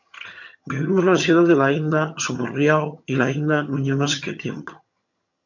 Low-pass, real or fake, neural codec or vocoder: 7.2 kHz; fake; vocoder, 22.05 kHz, 80 mel bands, HiFi-GAN